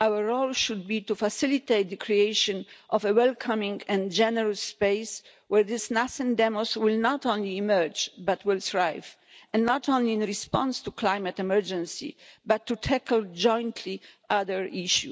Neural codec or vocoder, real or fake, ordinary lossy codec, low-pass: none; real; none; none